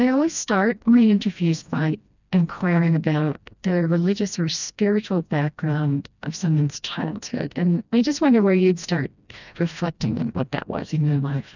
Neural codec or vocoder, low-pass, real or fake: codec, 16 kHz, 1 kbps, FreqCodec, smaller model; 7.2 kHz; fake